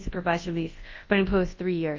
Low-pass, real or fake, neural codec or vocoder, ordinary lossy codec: 7.2 kHz; fake; codec, 24 kHz, 0.9 kbps, WavTokenizer, large speech release; Opus, 32 kbps